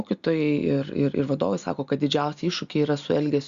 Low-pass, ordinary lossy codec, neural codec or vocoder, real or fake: 7.2 kHz; Opus, 64 kbps; none; real